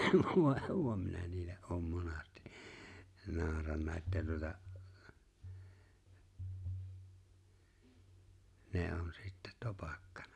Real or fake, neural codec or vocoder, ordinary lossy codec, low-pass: real; none; none; none